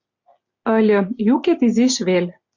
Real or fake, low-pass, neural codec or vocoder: real; 7.2 kHz; none